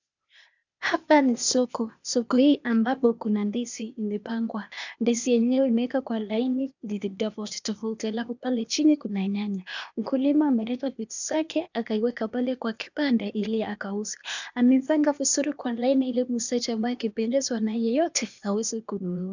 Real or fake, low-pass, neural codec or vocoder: fake; 7.2 kHz; codec, 16 kHz, 0.8 kbps, ZipCodec